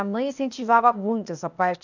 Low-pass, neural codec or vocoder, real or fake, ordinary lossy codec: 7.2 kHz; codec, 16 kHz, 0.8 kbps, ZipCodec; fake; none